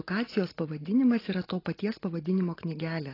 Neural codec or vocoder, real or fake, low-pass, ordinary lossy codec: none; real; 5.4 kHz; AAC, 24 kbps